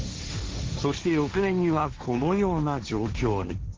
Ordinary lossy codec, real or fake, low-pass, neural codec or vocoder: Opus, 16 kbps; fake; 7.2 kHz; codec, 16 kHz, 1.1 kbps, Voila-Tokenizer